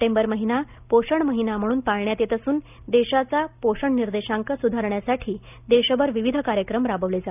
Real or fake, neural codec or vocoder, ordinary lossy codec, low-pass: real; none; none; 3.6 kHz